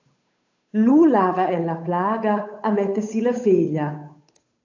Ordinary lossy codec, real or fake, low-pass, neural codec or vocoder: AAC, 48 kbps; fake; 7.2 kHz; codec, 16 kHz, 8 kbps, FunCodec, trained on Chinese and English, 25 frames a second